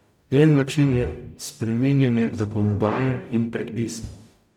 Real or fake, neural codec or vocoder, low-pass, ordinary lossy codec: fake; codec, 44.1 kHz, 0.9 kbps, DAC; 19.8 kHz; none